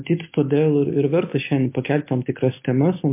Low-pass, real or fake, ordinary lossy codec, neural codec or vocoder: 3.6 kHz; real; MP3, 24 kbps; none